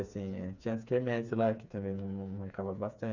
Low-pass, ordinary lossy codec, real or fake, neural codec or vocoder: 7.2 kHz; none; fake; codec, 16 kHz, 4 kbps, FreqCodec, smaller model